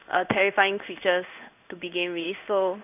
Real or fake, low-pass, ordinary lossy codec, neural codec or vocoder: fake; 3.6 kHz; none; codec, 16 kHz in and 24 kHz out, 1 kbps, XY-Tokenizer